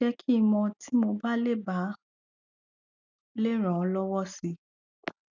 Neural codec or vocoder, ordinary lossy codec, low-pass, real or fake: none; none; 7.2 kHz; real